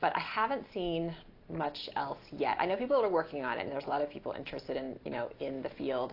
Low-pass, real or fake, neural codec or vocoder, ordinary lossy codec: 5.4 kHz; real; none; AAC, 32 kbps